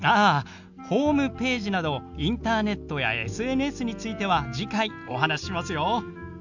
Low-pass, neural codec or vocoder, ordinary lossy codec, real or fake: 7.2 kHz; none; none; real